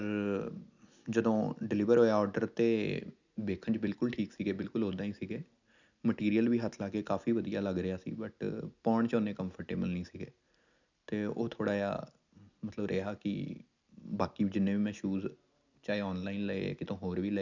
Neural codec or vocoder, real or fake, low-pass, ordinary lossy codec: none; real; 7.2 kHz; none